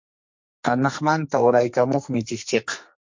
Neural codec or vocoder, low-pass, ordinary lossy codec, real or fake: codec, 44.1 kHz, 2.6 kbps, SNAC; 7.2 kHz; MP3, 48 kbps; fake